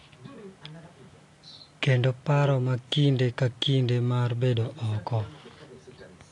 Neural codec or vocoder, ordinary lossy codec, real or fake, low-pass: none; AAC, 48 kbps; real; 10.8 kHz